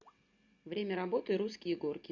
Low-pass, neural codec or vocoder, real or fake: 7.2 kHz; none; real